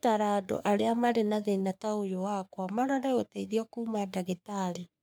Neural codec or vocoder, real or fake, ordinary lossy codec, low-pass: codec, 44.1 kHz, 3.4 kbps, Pupu-Codec; fake; none; none